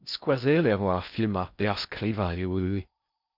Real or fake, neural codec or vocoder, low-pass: fake; codec, 16 kHz in and 24 kHz out, 0.6 kbps, FocalCodec, streaming, 4096 codes; 5.4 kHz